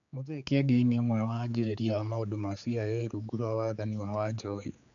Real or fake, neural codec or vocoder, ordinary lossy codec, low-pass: fake; codec, 16 kHz, 4 kbps, X-Codec, HuBERT features, trained on general audio; none; 7.2 kHz